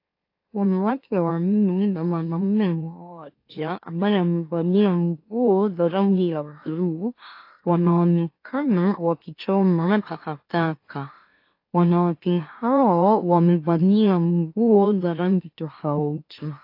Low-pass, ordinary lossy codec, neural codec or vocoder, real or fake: 5.4 kHz; AAC, 32 kbps; autoencoder, 44.1 kHz, a latent of 192 numbers a frame, MeloTTS; fake